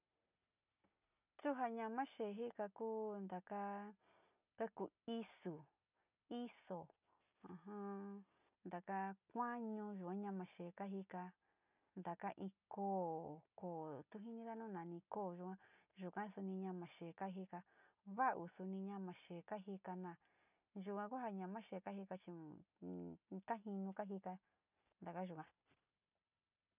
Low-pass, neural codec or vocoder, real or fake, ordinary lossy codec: 3.6 kHz; none; real; none